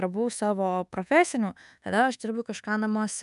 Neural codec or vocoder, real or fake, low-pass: codec, 24 kHz, 1.2 kbps, DualCodec; fake; 10.8 kHz